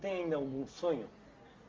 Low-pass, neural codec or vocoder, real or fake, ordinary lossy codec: 7.2 kHz; none; real; Opus, 32 kbps